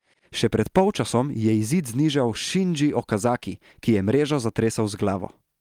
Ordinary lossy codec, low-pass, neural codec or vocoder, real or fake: Opus, 32 kbps; 19.8 kHz; none; real